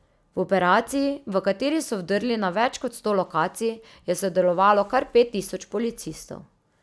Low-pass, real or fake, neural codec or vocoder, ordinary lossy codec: none; real; none; none